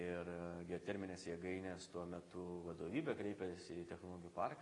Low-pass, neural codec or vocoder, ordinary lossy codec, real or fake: 10.8 kHz; none; AAC, 32 kbps; real